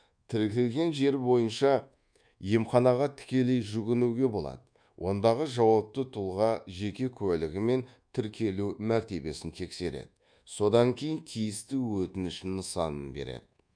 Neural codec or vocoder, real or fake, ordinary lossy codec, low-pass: codec, 24 kHz, 1.2 kbps, DualCodec; fake; none; 9.9 kHz